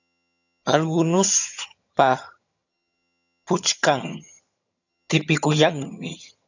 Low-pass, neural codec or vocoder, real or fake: 7.2 kHz; vocoder, 22.05 kHz, 80 mel bands, HiFi-GAN; fake